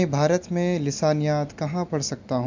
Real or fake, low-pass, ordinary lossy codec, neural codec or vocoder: real; 7.2 kHz; MP3, 64 kbps; none